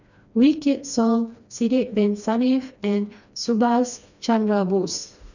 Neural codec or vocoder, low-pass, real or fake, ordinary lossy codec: codec, 16 kHz, 2 kbps, FreqCodec, smaller model; 7.2 kHz; fake; none